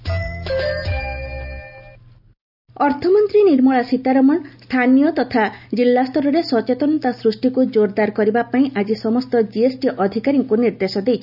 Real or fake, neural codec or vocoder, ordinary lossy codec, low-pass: real; none; none; 5.4 kHz